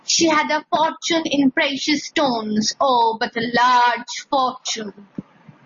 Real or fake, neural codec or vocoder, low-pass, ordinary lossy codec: real; none; 7.2 kHz; MP3, 32 kbps